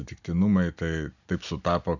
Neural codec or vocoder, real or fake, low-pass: none; real; 7.2 kHz